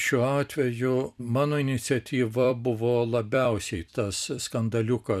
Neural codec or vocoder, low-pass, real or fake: vocoder, 44.1 kHz, 128 mel bands every 256 samples, BigVGAN v2; 14.4 kHz; fake